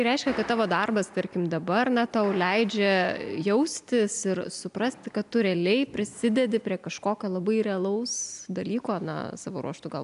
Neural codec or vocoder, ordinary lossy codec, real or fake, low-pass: none; AAC, 96 kbps; real; 10.8 kHz